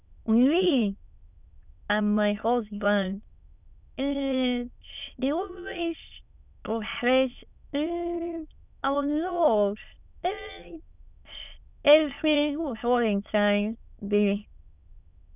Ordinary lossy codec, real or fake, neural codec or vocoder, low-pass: none; fake; autoencoder, 22.05 kHz, a latent of 192 numbers a frame, VITS, trained on many speakers; 3.6 kHz